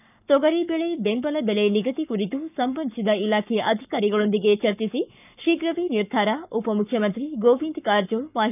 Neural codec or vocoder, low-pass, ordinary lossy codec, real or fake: codec, 44.1 kHz, 7.8 kbps, Pupu-Codec; 3.6 kHz; none; fake